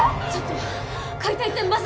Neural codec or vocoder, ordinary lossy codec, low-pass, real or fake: none; none; none; real